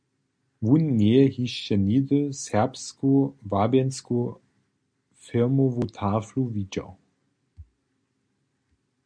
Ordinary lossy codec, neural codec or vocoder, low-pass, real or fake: MP3, 64 kbps; none; 9.9 kHz; real